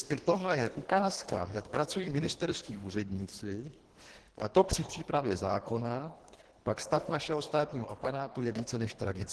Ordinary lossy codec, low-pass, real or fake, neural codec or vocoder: Opus, 16 kbps; 10.8 kHz; fake; codec, 24 kHz, 1.5 kbps, HILCodec